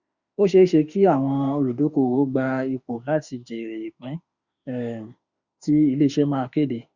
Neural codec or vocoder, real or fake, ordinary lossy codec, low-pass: autoencoder, 48 kHz, 32 numbers a frame, DAC-VAE, trained on Japanese speech; fake; Opus, 64 kbps; 7.2 kHz